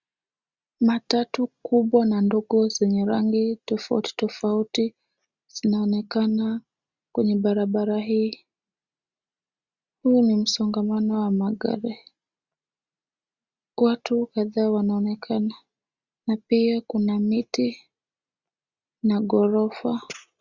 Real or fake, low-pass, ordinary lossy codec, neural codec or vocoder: real; 7.2 kHz; Opus, 64 kbps; none